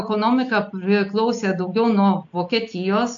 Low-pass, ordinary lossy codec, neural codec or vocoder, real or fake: 7.2 kHz; AAC, 64 kbps; none; real